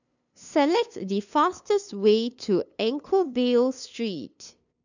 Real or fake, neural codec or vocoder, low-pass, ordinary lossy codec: fake; codec, 16 kHz, 2 kbps, FunCodec, trained on LibriTTS, 25 frames a second; 7.2 kHz; none